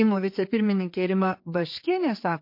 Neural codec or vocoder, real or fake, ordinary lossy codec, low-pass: codec, 16 kHz, 4 kbps, X-Codec, HuBERT features, trained on general audio; fake; MP3, 32 kbps; 5.4 kHz